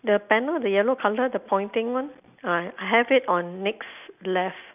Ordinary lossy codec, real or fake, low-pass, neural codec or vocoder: none; real; 3.6 kHz; none